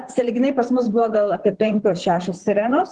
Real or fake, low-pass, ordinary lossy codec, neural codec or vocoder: fake; 10.8 kHz; Opus, 16 kbps; vocoder, 44.1 kHz, 128 mel bands, Pupu-Vocoder